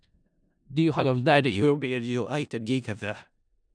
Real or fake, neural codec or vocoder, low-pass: fake; codec, 16 kHz in and 24 kHz out, 0.4 kbps, LongCat-Audio-Codec, four codebook decoder; 9.9 kHz